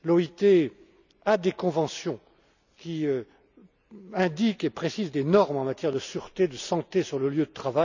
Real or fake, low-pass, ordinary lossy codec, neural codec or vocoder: real; 7.2 kHz; none; none